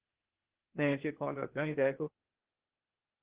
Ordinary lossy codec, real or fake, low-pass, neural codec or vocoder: Opus, 16 kbps; fake; 3.6 kHz; codec, 16 kHz, 0.8 kbps, ZipCodec